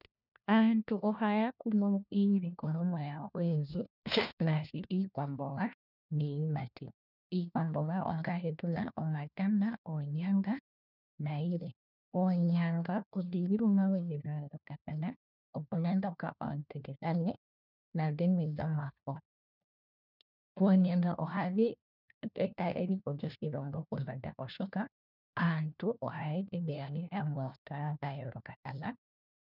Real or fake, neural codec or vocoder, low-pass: fake; codec, 16 kHz, 1 kbps, FunCodec, trained on LibriTTS, 50 frames a second; 5.4 kHz